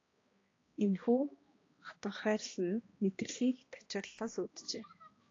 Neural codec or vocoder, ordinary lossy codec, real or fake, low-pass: codec, 16 kHz, 1 kbps, X-Codec, HuBERT features, trained on balanced general audio; AAC, 32 kbps; fake; 7.2 kHz